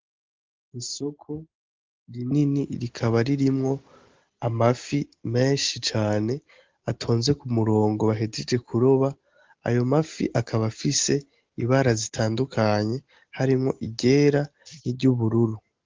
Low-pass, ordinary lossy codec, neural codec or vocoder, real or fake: 7.2 kHz; Opus, 16 kbps; none; real